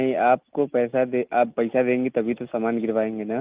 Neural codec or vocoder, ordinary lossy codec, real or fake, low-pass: none; Opus, 32 kbps; real; 3.6 kHz